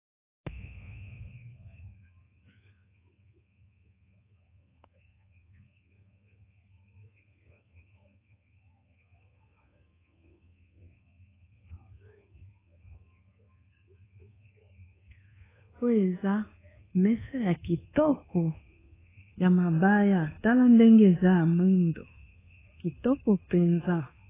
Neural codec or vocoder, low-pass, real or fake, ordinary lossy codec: codec, 24 kHz, 1.2 kbps, DualCodec; 3.6 kHz; fake; AAC, 16 kbps